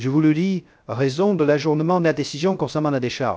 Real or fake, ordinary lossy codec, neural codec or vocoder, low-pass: fake; none; codec, 16 kHz, 0.3 kbps, FocalCodec; none